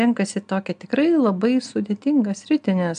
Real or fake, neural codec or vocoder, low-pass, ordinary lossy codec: real; none; 9.9 kHz; MP3, 96 kbps